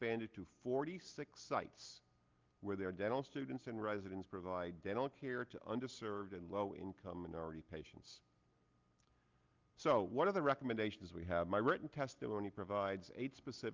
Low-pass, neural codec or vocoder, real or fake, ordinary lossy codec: 7.2 kHz; none; real; Opus, 24 kbps